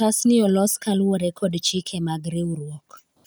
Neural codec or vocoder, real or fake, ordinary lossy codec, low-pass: none; real; none; none